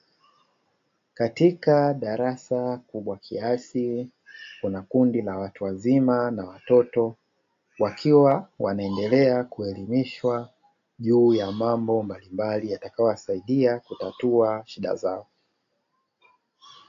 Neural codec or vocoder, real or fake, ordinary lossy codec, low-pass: none; real; AAC, 48 kbps; 7.2 kHz